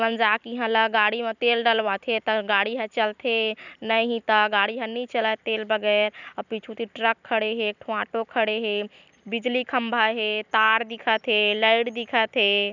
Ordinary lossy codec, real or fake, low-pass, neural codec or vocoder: none; fake; 7.2 kHz; vocoder, 44.1 kHz, 128 mel bands every 256 samples, BigVGAN v2